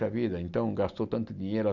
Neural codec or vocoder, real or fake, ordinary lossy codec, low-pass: none; real; none; 7.2 kHz